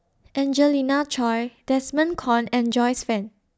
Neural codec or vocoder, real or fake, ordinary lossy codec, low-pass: none; real; none; none